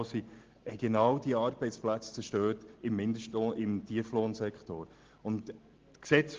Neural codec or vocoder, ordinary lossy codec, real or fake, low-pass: none; Opus, 16 kbps; real; 7.2 kHz